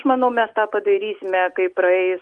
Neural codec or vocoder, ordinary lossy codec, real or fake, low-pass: none; Opus, 24 kbps; real; 10.8 kHz